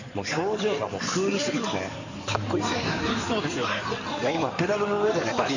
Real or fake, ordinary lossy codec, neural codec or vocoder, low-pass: fake; none; codec, 16 kHz in and 24 kHz out, 2.2 kbps, FireRedTTS-2 codec; 7.2 kHz